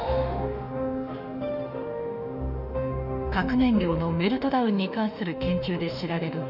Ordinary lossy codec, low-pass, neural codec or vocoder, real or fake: none; 5.4 kHz; autoencoder, 48 kHz, 32 numbers a frame, DAC-VAE, trained on Japanese speech; fake